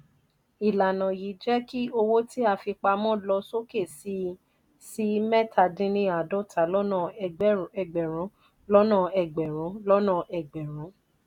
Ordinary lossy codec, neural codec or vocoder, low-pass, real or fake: none; none; 19.8 kHz; real